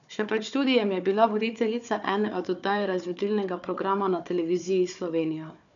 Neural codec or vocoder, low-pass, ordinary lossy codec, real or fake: codec, 16 kHz, 4 kbps, FunCodec, trained on Chinese and English, 50 frames a second; 7.2 kHz; none; fake